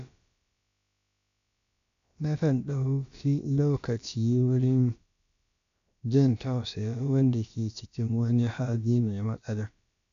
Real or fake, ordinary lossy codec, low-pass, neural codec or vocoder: fake; AAC, 96 kbps; 7.2 kHz; codec, 16 kHz, about 1 kbps, DyCAST, with the encoder's durations